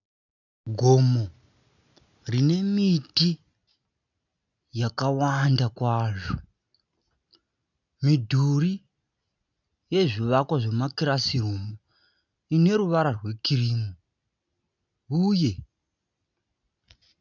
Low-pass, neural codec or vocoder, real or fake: 7.2 kHz; none; real